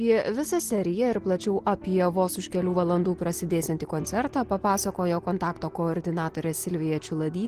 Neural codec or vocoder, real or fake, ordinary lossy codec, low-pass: none; real; Opus, 16 kbps; 14.4 kHz